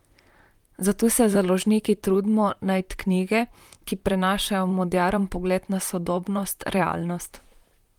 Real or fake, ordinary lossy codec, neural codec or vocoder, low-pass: fake; Opus, 32 kbps; vocoder, 44.1 kHz, 128 mel bands, Pupu-Vocoder; 19.8 kHz